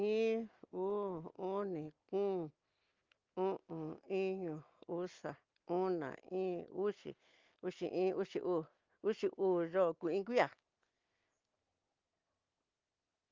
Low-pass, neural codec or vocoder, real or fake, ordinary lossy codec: 7.2 kHz; none; real; Opus, 32 kbps